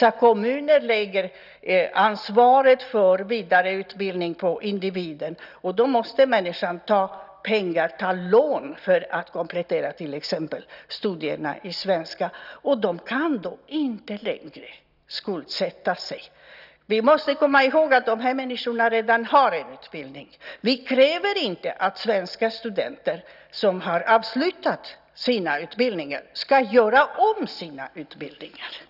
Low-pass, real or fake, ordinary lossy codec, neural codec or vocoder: 5.4 kHz; real; none; none